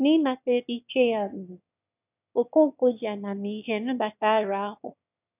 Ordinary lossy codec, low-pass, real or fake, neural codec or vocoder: none; 3.6 kHz; fake; autoencoder, 22.05 kHz, a latent of 192 numbers a frame, VITS, trained on one speaker